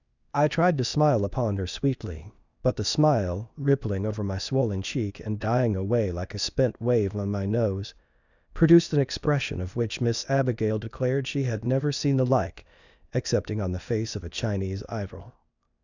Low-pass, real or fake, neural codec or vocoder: 7.2 kHz; fake; codec, 16 kHz, 0.8 kbps, ZipCodec